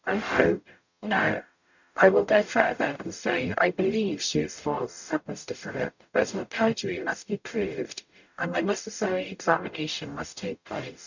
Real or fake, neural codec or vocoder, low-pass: fake; codec, 44.1 kHz, 0.9 kbps, DAC; 7.2 kHz